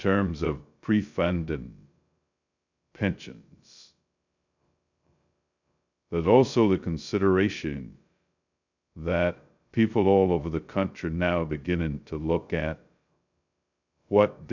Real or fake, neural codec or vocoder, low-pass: fake; codec, 16 kHz, 0.2 kbps, FocalCodec; 7.2 kHz